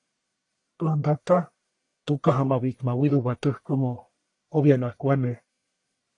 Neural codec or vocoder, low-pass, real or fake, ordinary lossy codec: codec, 44.1 kHz, 1.7 kbps, Pupu-Codec; 10.8 kHz; fake; AAC, 48 kbps